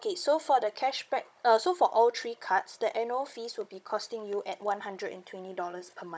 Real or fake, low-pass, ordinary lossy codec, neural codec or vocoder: fake; none; none; codec, 16 kHz, 16 kbps, FunCodec, trained on Chinese and English, 50 frames a second